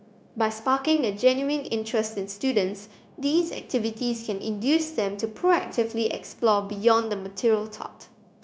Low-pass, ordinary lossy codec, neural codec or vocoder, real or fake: none; none; codec, 16 kHz, 0.9 kbps, LongCat-Audio-Codec; fake